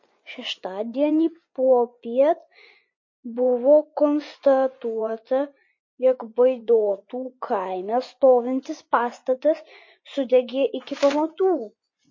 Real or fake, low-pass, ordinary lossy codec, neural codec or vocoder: fake; 7.2 kHz; MP3, 32 kbps; vocoder, 24 kHz, 100 mel bands, Vocos